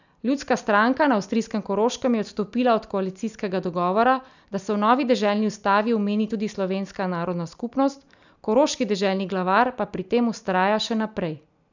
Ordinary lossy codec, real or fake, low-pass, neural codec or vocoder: none; real; 7.2 kHz; none